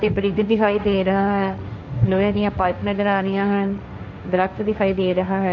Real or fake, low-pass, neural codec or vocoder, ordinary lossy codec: fake; 7.2 kHz; codec, 16 kHz, 1.1 kbps, Voila-Tokenizer; MP3, 64 kbps